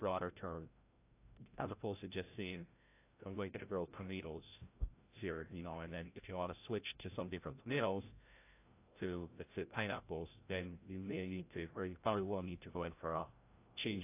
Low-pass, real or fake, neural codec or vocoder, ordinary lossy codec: 3.6 kHz; fake; codec, 16 kHz, 0.5 kbps, FreqCodec, larger model; AAC, 24 kbps